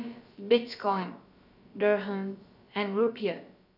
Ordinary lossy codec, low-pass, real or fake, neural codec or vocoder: none; 5.4 kHz; fake; codec, 16 kHz, about 1 kbps, DyCAST, with the encoder's durations